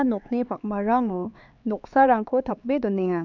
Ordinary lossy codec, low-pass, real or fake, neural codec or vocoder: none; 7.2 kHz; fake; codec, 16 kHz, 4 kbps, X-Codec, HuBERT features, trained on LibriSpeech